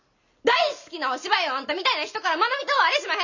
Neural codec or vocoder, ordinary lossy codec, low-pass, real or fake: none; none; 7.2 kHz; real